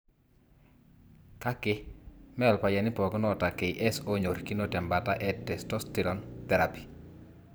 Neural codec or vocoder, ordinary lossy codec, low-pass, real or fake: vocoder, 44.1 kHz, 128 mel bands every 512 samples, BigVGAN v2; none; none; fake